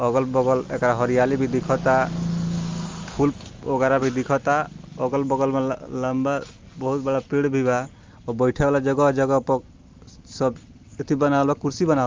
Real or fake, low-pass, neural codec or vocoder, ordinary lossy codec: real; 7.2 kHz; none; Opus, 32 kbps